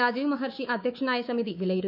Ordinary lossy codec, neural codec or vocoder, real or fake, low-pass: none; codec, 24 kHz, 3.1 kbps, DualCodec; fake; 5.4 kHz